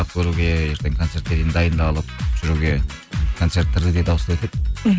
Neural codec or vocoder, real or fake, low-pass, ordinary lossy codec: none; real; none; none